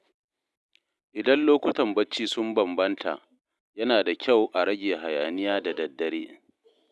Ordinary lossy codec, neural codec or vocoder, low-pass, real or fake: none; none; none; real